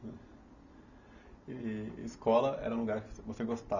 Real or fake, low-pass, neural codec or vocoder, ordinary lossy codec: real; 7.2 kHz; none; none